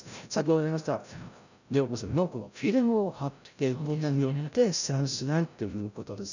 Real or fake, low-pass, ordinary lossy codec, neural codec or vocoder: fake; 7.2 kHz; none; codec, 16 kHz, 0.5 kbps, FreqCodec, larger model